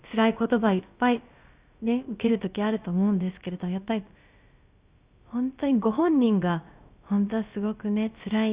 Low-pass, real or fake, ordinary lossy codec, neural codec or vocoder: 3.6 kHz; fake; Opus, 64 kbps; codec, 16 kHz, about 1 kbps, DyCAST, with the encoder's durations